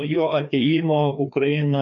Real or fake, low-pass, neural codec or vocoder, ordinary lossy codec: fake; 7.2 kHz; codec, 16 kHz, 2 kbps, FreqCodec, larger model; AAC, 64 kbps